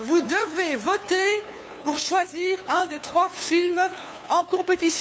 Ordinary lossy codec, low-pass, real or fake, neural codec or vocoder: none; none; fake; codec, 16 kHz, 2 kbps, FunCodec, trained on LibriTTS, 25 frames a second